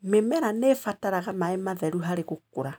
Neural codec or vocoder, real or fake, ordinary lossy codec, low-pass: none; real; none; none